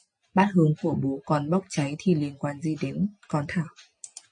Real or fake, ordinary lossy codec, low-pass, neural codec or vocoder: real; MP3, 48 kbps; 9.9 kHz; none